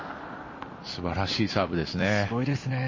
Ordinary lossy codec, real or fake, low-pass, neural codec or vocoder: MP3, 32 kbps; fake; 7.2 kHz; vocoder, 44.1 kHz, 128 mel bands every 256 samples, BigVGAN v2